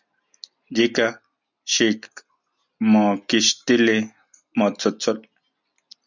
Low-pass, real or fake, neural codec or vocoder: 7.2 kHz; real; none